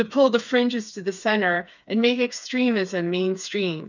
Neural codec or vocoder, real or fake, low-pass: codec, 16 kHz, 4 kbps, FreqCodec, smaller model; fake; 7.2 kHz